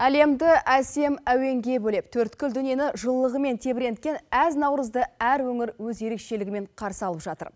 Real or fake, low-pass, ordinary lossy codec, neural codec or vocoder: real; none; none; none